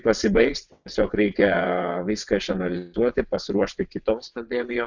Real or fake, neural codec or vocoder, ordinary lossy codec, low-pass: fake; vocoder, 22.05 kHz, 80 mel bands, WaveNeXt; Opus, 64 kbps; 7.2 kHz